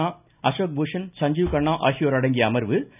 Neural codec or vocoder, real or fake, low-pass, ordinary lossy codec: none; real; 3.6 kHz; none